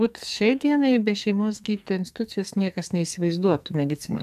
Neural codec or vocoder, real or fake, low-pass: codec, 44.1 kHz, 2.6 kbps, SNAC; fake; 14.4 kHz